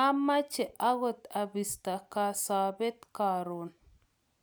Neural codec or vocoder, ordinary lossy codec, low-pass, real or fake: none; none; none; real